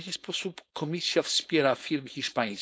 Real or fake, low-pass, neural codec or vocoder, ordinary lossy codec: fake; none; codec, 16 kHz, 4.8 kbps, FACodec; none